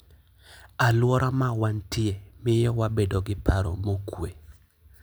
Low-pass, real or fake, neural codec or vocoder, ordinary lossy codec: none; real; none; none